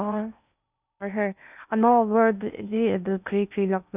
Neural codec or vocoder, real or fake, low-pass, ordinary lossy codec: codec, 16 kHz in and 24 kHz out, 0.6 kbps, FocalCodec, streaming, 4096 codes; fake; 3.6 kHz; none